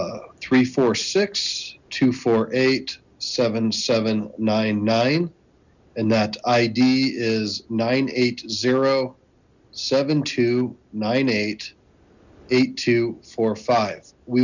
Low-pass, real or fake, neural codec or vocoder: 7.2 kHz; real; none